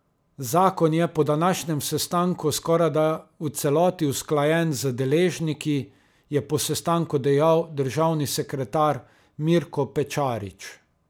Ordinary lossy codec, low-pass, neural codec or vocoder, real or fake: none; none; none; real